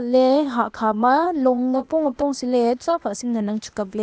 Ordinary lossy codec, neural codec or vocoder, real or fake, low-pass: none; codec, 16 kHz, 0.8 kbps, ZipCodec; fake; none